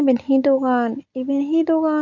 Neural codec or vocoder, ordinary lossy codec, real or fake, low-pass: none; none; real; 7.2 kHz